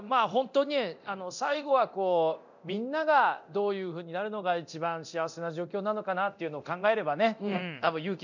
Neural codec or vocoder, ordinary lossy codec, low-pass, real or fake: codec, 24 kHz, 0.9 kbps, DualCodec; none; 7.2 kHz; fake